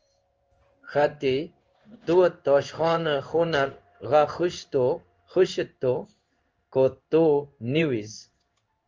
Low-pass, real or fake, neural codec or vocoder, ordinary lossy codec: 7.2 kHz; fake; codec, 16 kHz in and 24 kHz out, 1 kbps, XY-Tokenizer; Opus, 24 kbps